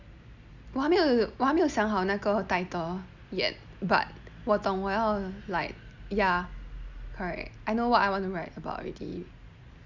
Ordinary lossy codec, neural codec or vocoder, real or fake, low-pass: Opus, 64 kbps; none; real; 7.2 kHz